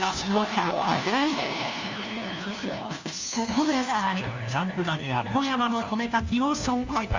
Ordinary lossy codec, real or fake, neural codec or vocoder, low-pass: Opus, 64 kbps; fake; codec, 16 kHz, 1 kbps, FunCodec, trained on LibriTTS, 50 frames a second; 7.2 kHz